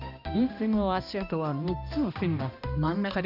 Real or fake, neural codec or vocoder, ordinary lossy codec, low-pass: fake; codec, 16 kHz, 1 kbps, X-Codec, HuBERT features, trained on balanced general audio; none; 5.4 kHz